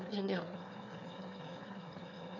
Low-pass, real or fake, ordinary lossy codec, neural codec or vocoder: 7.2 kHz; fake; none; autoencoder, 22.05 kHz, a latent of 192 numbers a frame, VITS, trained on one speaker